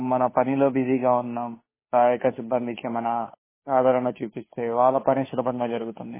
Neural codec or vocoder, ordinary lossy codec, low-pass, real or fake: codec, 16 kHz, 2 kbps, FunCodec, trained on Chinese and English, 25 frames a second; MP3, 16 kbps; 3.6 kHz; fake